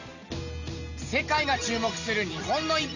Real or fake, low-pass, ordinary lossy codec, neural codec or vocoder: real; 7.2 kHz; none; none